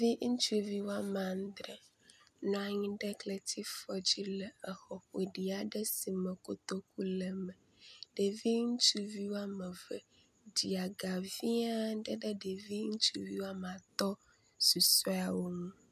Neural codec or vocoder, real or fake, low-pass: none; real; 14.4 kHz